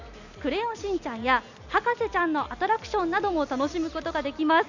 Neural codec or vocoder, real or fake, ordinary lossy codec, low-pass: none; real; none; 7.2 kHz